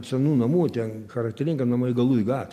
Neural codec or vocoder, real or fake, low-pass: none; real; 14.4 kHz